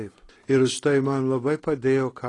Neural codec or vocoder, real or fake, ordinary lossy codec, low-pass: none; real; AAC, 32 kbps; 10.8 kHz